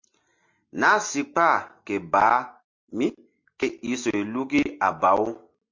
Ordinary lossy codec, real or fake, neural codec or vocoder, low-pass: MP3, 48 kbps; real; none; 7.2 kHz